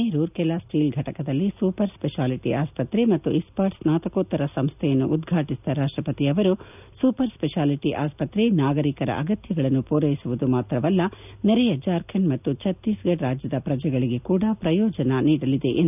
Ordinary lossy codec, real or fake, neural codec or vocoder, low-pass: none; real; none; 3.6 kHz